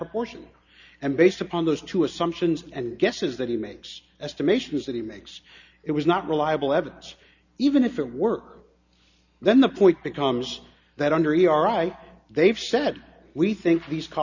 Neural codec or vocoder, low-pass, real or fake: none; 7.2 kHz; real